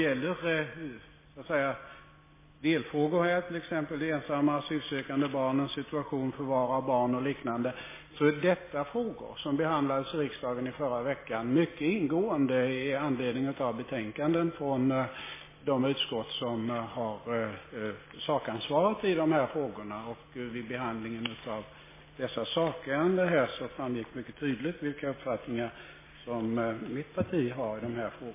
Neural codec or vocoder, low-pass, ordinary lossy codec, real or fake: none; 3.6 kHz; MP3, 16 kbps; real